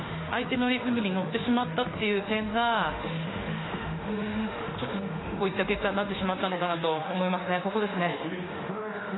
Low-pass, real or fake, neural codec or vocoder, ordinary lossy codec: 7.2 kHz; fake; autoencoder, 48 kHz, 32 numbers a frame, DAC-VAE, trained on Japanese speech; AAC, 16 kbps